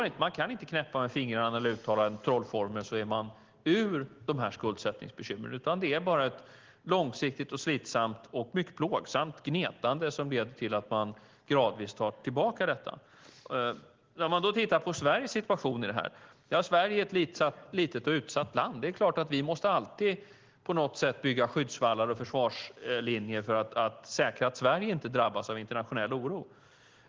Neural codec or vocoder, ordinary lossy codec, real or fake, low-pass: none; Opus, 16 kbps; real; 7.2 kHz